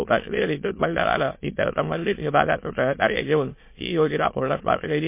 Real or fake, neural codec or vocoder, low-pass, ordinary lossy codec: fake; autoencoder, 22.05 kHz, a latent of 192 numbers a frame, VITS, trained on many speakers; 3.6 kHz; MP3, 24 kbps